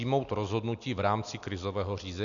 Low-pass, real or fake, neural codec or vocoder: 7.2 kHz; real; none